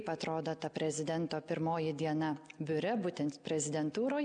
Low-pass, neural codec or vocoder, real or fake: 9.9 kHz; none; real